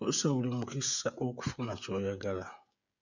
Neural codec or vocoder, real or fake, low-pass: codec, 16 kHz, 16 kbps, FreqCodec, smaller model; fake; 7.2 kHz